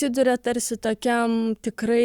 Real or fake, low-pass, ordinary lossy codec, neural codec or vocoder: fake; 19.8 kHz; Opus, 64 kbps; codec, 44.1 kHz, 7.8 kbps, Pupu-Codec